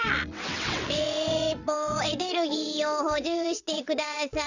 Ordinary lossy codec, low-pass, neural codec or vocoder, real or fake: none; 7.2 kHz; vocoder, 22.05 kHz, 80 mel bands, WaveNeXt; fake